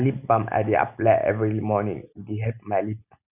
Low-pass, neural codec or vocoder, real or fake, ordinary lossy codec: 3.6 kHz; none; real; none